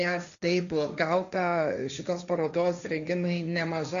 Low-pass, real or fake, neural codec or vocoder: 7.2 kHz; fake; codec, 16 kHz, 1.1 kbps, Voila-Tokenizer